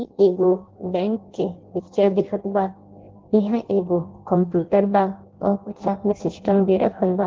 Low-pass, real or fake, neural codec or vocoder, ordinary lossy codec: 7.2 kHz; fake; codec, 16 kHz in and 24 kHz out, 0.6 kbps, FireRedTTS-2 codec; Opus, 16 kbps